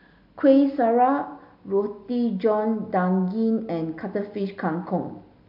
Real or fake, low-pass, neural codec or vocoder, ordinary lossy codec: real; 5.4 kHz; none; AAC, 48 kbps